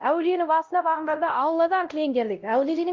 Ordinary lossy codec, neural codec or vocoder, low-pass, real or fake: Opus, 32 kbps; codec, 16 kHz, 0.5 kbps, X-Codec, WavLM features, trained on Multilingual LibriSpeech; 7.2 kHz; fake